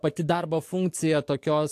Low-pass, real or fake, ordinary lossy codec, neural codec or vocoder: 14.4 kHz; fake; AAC, 64 kbps; codec, 44.1 kHz, 7.8 kbps, DAC